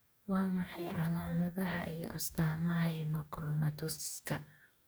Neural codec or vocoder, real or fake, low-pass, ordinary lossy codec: codec, 44.1 kHz, 2.6 kbps, DAC; fake; none; none